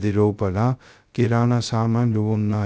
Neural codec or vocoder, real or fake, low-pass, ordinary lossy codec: codec, 16 kHz, 0.2 kbps, FocalCodec; fake; none; none